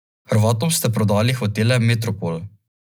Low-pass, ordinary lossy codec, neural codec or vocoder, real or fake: none; none; none; real